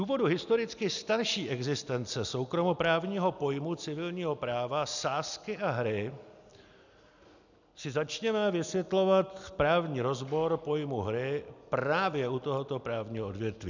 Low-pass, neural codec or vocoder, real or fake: 7.2 kHz; none; real